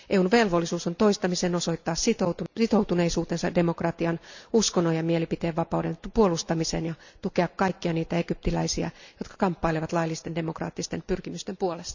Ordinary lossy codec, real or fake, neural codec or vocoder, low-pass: none; real; none; 7.2 kHz